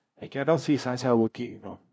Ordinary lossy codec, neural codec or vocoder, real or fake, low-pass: none; codec, 16 kHz, 0.5 kbps, FunCodec, trained on LibriTTS, 25 frames a second; fake; none